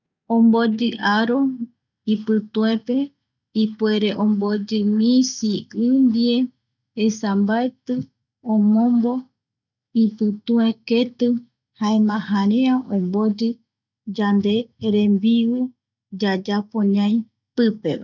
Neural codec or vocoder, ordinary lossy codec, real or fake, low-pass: none; none; real; 7.2 kHz